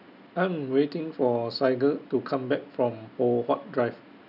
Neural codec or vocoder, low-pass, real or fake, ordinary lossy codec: none; 5.4 kHz; real; none